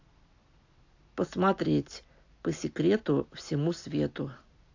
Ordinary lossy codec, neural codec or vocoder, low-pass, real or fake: AAC, 48 kbps; none; 7.2 kHz; real